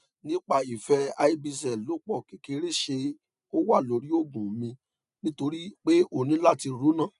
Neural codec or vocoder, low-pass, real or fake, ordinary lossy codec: none; 10.8 kHz; real; none